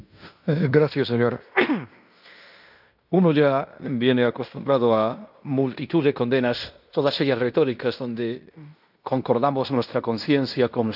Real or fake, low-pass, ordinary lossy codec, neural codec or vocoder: fake; 5.4 kHz; none; codec, 16 kHz in and 24 kHz out, 0.9 kbps, LongCat-Audio-Codec, fine tuned four codebook decoder